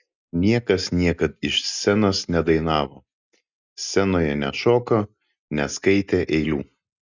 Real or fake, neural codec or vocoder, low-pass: real; none; 7.2 kHz